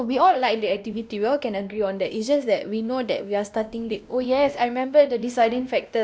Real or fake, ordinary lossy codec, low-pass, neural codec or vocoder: fake; none; none; codec, 16 kHz, 1 kbps, X-Codec, WavLM features, trained on Multilingual LibriSpeech